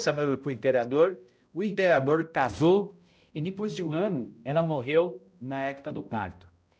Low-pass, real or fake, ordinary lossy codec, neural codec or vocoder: none; fake; none; codec, 16 kHz, 0.5 kbps, X-Codec, HuBERT features, trained on balanced general audio